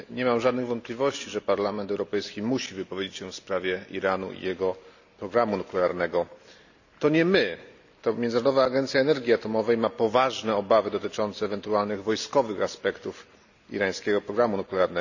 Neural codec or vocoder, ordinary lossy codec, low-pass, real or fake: none; none; 7.2 kHz; real